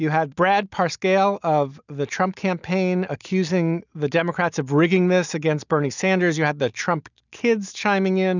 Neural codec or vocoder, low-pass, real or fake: none; 7.2 kHz; real